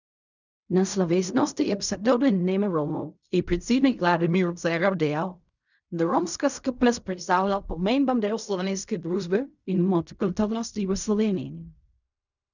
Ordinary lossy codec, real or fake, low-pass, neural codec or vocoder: none; fake; 7.2 kHz; codec, 16 kHz in and 24 kHz out, 0.4 kbps, LongCat-Audio-Codec, fine tuned four codebook decoder